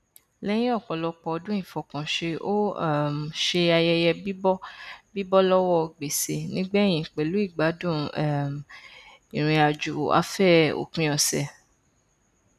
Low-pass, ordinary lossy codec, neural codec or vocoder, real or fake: 14.4 kHz; none; none; real